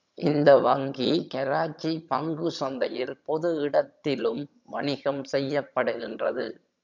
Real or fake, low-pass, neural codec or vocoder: fake; 7.2 kHz; vocoder, 22.05 kHz, 80 mel bands, HiFi-GAN